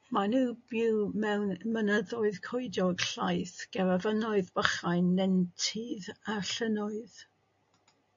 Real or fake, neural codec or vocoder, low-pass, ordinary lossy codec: real; none; 7.2 kHz; MP3, 48 kbps